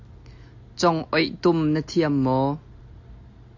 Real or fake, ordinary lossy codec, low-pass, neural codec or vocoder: real; AAC, 48 kbps; 7.2 kHz; none